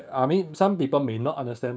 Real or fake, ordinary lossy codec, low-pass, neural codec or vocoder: fake; none; none; codec, 16 kHz, 6 kbps, DAC